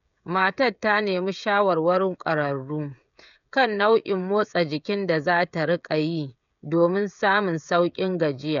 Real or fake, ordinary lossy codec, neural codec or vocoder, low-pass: fake; none; codec, 16 kHz, 16 kbps, FreqCodec, smaller model; 7.2 kHz